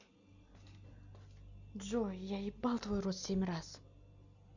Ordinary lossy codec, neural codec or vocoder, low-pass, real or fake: none; none; 7.2 kHz; real